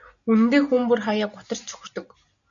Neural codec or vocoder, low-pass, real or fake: none; 7.2 kHz; real